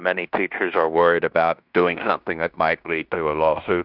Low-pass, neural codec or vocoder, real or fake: 5.4 kHz; codec, 16 kHz in and 24 kHz out, 0.9 kbps, LongCat-Audio-Codec, fine tuned four codebook decoder; fake